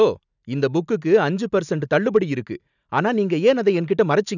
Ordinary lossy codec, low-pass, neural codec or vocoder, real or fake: none; 7.2 kHz; none; real